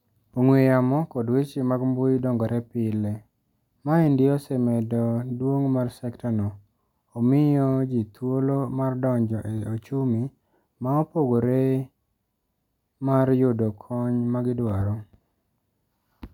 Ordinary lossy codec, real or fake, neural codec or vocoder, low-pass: none; real; none; 19.8 kHz